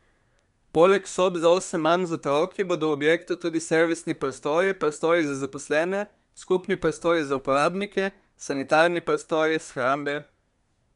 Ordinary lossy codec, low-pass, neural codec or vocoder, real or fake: none; 10.8 kHz; codec, 24 kHz, 1 kbps, SNAC; fake